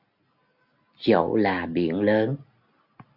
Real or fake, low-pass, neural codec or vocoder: real; 5.4 kHz; none